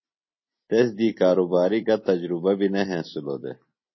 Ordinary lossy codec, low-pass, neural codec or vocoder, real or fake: MP3, 24 kbps; 7.2 kHz; none; real